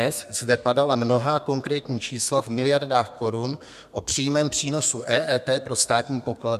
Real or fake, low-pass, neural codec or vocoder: fake; 14.4 kHz; codec, 32 kHz, 1.9 kbps, SNAC